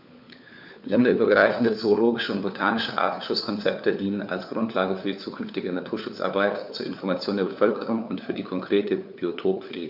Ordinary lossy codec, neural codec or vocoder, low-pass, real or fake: none; codec, 16 kHz, 4 kbps, FunCodec, trained on LibriTTS, 50 frames a second; 5.4 kHz; fake